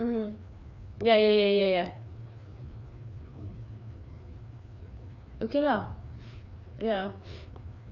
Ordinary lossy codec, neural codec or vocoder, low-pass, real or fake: none; codec, 16 kHz, 2 kbps, FreqCodec, larger model; 7.2 kHz; fake